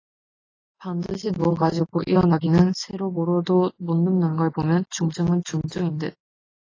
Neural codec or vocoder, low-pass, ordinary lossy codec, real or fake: none; 7.2 kHz; AAC, 32 kbps; real